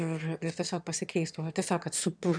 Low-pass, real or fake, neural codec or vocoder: 9.9 kHz; fake; autoencoder, 22.05 kHz, a latent of 192 numbers a frame, VITS, trained on one speaker